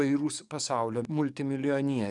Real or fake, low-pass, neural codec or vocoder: fake; 10.8 kHz; codec, 44.1 kHz, 7.8 kbps, DAC